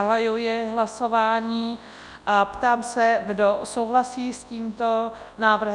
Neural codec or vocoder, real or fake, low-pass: codec, 24 kHz, 0.9 kbps, WavTokenizer, large speech release; fake; 10.8 kHz